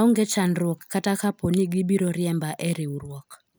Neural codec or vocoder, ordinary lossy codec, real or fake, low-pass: none; none; real; none